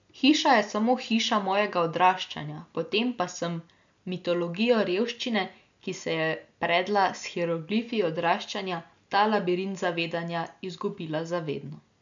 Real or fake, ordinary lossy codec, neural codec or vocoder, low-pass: real; MP3, 64 kbps; none; 7.2 kHz